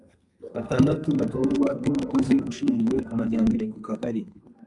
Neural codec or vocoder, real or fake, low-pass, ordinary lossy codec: codec, 32 kHz, 1.9 kbps, SNAC; fake; 10.8 kHz; MP3, 96 kbps